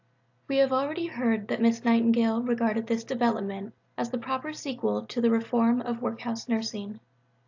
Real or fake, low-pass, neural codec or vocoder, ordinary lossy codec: real; 7.2 kHz; none; AAC, 48 kbps